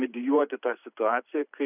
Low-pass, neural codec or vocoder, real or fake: 3.6 kHz; vocoder, 44.1 kHz, 128 mel bands every 512 samples, BigVGAN v2; fake